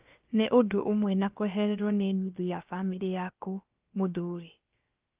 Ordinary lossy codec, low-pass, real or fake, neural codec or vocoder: Opus, 32 kbps; 3.6 kHz; fake; codec, 16 kHz, about 1 kbps, DyCAST, with the encoder's durations